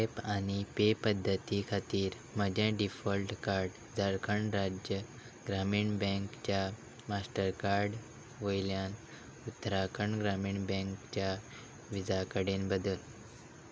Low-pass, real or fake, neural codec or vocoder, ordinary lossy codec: none; real; none; none